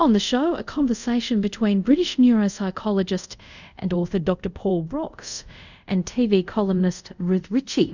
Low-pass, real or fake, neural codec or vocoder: 7.2 kHz; fake; codec, 24 kHz, 0.5 kbps, DualCodec